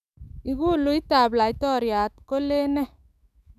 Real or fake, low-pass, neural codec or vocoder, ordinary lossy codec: fake; 14.4 kHz; autoencoder, 48 kHz, 128 numbers a frame, DAC-VAE, trained on Japanese speech; none